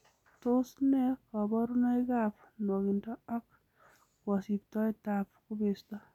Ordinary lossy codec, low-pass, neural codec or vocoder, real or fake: none; 19.8 kHz; none; real